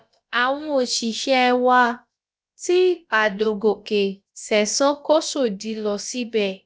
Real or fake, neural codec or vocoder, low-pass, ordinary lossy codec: fake; codec, 16 kHz, about 1 kbps, DyCAST, with the encoder's durations; none; none